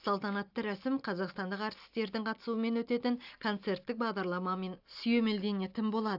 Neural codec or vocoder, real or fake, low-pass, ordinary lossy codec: none; real; 5.4 kHz; AAC, 48 kbps